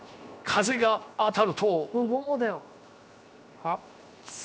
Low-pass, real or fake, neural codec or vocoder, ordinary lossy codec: none; fake; codec, 16 kHz, 0.7 kbps, FocalCodec; none